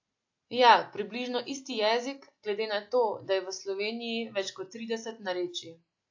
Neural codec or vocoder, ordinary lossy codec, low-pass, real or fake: none; AAC, 48 kbps; 7.2 kHz; real